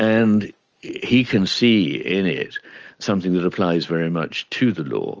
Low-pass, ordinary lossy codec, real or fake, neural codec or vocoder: 7.2 kHz; Opus, 24 kbps; real; none